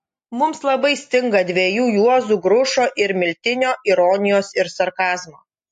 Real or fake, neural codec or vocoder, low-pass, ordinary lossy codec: real; none; 10.8 kHz; MP3, 48 kbps